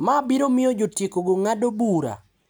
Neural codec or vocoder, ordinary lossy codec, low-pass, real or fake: none; none; none; real